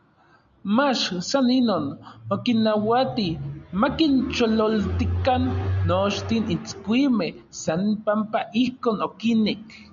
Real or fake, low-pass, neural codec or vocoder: real; 7.2 kHz; none